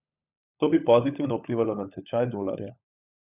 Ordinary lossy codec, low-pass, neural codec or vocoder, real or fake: none; 3.6 kHz; codec, 16 kHz, 16 kbps, FunCodec, trained on LibriTTS, 50 frames a second; fake